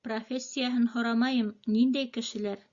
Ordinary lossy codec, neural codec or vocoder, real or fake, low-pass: Opus, 64 kbps; none; real; 7.2 kHz